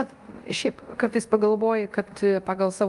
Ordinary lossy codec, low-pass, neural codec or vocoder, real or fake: Opus, 32 kbps; 10.8 kHz; codec, 24 kHz, 0.5 kbps, DualCodec; fake